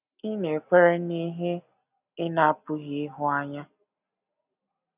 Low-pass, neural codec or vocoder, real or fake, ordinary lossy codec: 3.6 kHz; none; real; none